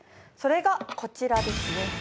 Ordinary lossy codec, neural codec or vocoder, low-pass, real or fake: none; none; none; real